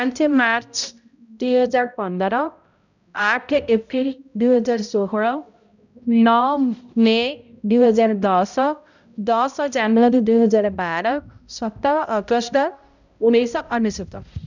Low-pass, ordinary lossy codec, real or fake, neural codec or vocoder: 7.2 kHz; none; fake; codec, 16 kHz, 0.5 kbps, X-Codec, HuBERT features, trained on balanced general audio